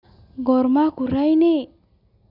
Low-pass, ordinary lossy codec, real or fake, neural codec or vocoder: 5.4 kHz; MP3, 48 kbps; real; none